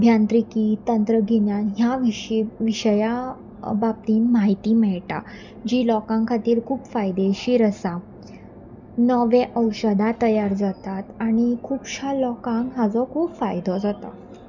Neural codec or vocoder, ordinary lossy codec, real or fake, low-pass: none; none; real; 7.2 kHz